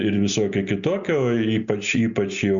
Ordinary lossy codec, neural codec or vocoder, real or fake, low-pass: Opus, 64 kbps; none; real; 7.2 kHz